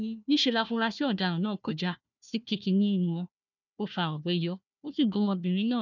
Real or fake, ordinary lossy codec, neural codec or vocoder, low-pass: fake; none; codec, 16 kHz, 1 kbps, FunCodec, trained on Chinese and English, 50 frames a second; 7.2 kHz